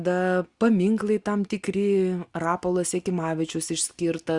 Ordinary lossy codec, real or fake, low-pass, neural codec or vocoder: Opus, 64 kbps; real; 10.8 kHz; none